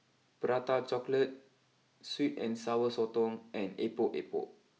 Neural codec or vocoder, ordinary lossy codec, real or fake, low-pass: none; none; real; none